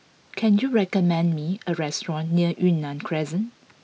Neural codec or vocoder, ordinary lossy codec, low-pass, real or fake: none; none; none; real